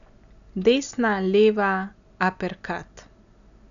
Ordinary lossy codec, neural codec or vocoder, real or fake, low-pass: none; none; real; 7.2 kHz